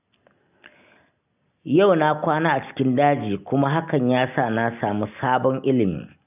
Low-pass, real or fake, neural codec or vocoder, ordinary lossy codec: 3.6 kHz; real; none; none